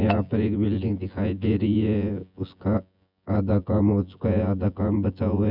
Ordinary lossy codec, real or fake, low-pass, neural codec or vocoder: none; fake; 5.4 kHz; vocoder, 24 kHz, 100 mel bands, Vocos